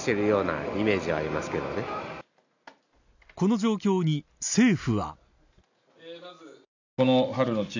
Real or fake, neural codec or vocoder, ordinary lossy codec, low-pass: real; none; none; 7.2 kHz